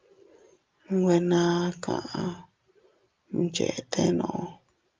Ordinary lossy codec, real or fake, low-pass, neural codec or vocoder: Opus, 24 kbps; real; 7.2 kHz; none